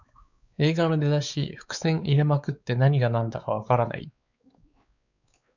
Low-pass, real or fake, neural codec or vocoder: 7.2 kHz; fake; codec, 16 kHz, 4 kbps, X-Codec, WavLM features, trained on Multilingual LibriSpeech